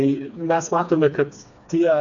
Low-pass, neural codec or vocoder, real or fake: 7.2 kHz; codec, 16 kHz, 2 kbps, FreqCodec, smaller model; fake